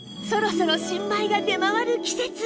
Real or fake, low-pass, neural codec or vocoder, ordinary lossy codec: real; none; none; none